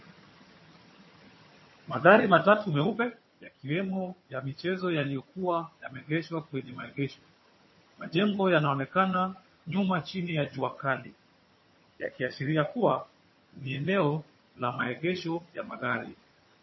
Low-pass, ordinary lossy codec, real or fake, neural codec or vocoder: 7.2 kHz; MP3, 24 kbps; fake; vocoder, 22.05 kHz, 80 mel bands, HiFi-GAN